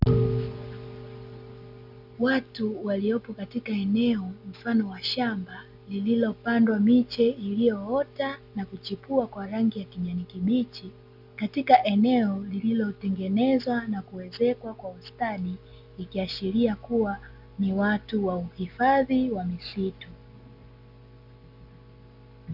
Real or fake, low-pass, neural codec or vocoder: real; 5.4 kHz; none